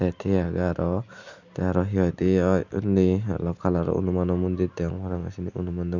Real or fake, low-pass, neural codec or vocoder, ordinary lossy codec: real; 7.2 kHz; none; none